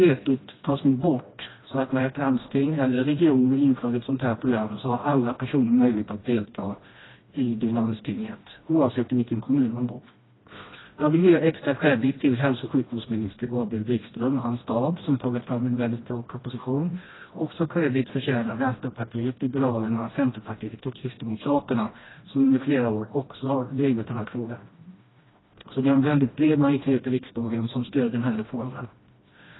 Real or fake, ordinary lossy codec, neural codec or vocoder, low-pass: fake; AAC, 16 kbps; codec, 16 kHz, 1 kbps, FreqCodec, smaller model; 7.2 kHz